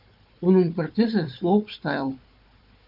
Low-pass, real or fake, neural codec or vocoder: 5.4 kHz; fake; codec, 16 kHz, 4 kbps, FunCodec, trained on Chinese and English, 50 frames a second